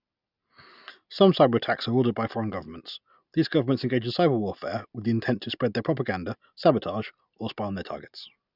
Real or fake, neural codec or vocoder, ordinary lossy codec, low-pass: real; none; none; 5.4 kHz